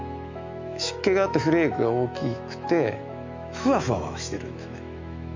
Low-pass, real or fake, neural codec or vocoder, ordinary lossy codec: 7.2 kHz; fake; autoencoder, 48 kHz, 128 numbers a frame, DAC-VAE, trained on Japanese speech; MP3, 64 kbps